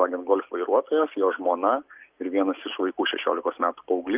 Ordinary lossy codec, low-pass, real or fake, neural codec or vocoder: Opus, 32 kbps; 3.6 kHz; real; none